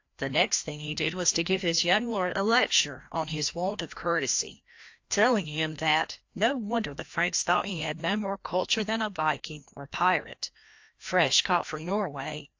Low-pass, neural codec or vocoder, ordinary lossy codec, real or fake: 7.2 kHz; codec, 16 kHz, 1 kbps, FreqCodec, larger model; AAC, 48 kbps; fake